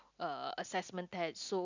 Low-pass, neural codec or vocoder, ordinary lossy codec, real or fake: 7.2 kHz; none; none; real